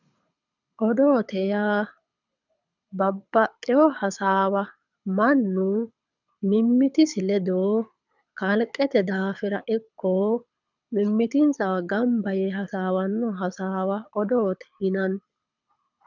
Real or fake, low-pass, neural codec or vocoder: fake; 7.2 kHz; codec, 24 kHz, 6 kbps, HILCodec